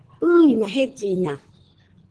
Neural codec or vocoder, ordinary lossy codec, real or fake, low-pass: codec, 24 kHz, 3 kbps, HILCodec; Opus, 16 kbps; fake; 10.8 kHz